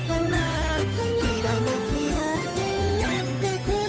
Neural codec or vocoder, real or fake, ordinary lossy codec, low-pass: codec, 16 kHz, 8 kbps, FunCodec, trained on Chinese and English, 25 frames a second; fake; none; none